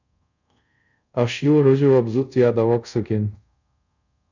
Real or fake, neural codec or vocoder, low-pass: fake; codec, 24 kHz, 0.5 kbps, DualCodec; 7.2 kHz